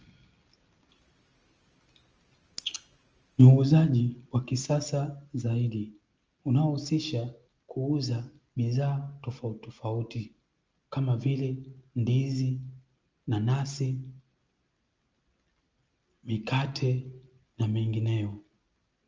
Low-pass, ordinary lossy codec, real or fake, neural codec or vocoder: 7.2 kHz; Opus, 24 kbps; real; none